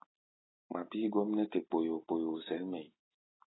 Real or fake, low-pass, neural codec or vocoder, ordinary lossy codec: real; 7.2 kHz; none; AAC, 16 kbps